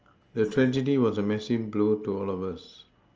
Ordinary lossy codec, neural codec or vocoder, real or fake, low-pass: Opus, 24 kbps; none; real; 7.2 kHz